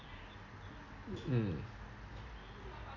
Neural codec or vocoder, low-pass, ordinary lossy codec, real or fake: none; 7.2 kHz; none; real